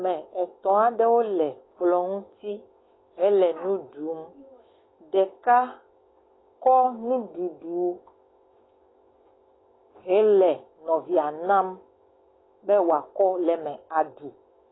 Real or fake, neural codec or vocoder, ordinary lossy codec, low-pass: fake; autoencoder, 48 kHz, 128 numbers a frame, DAC-VAE, trained on Japanese speech; AAC, 16 kbps; 7.2 kHz